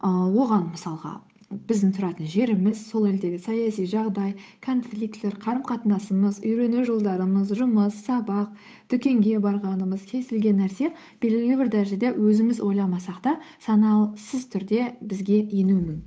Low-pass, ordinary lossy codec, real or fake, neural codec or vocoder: none; none; fake; codec, 16 kHz, 8 kbps, FunCodec, trained on Chinese and English, 25 frames a second